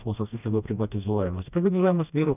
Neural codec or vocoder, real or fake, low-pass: codec, 16 kHz, 1 kbps, FreqCodec, smaller model; fake; 3.6 kHz